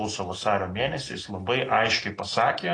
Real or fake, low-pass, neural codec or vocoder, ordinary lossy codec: real; 9.9 kHz; none; AAC, 32 kbps